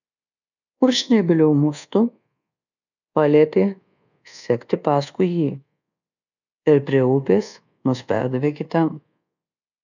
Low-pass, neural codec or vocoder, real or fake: 7.2 kHz; codec, 24 kHz, 1.2 kbps, DualCodec; fake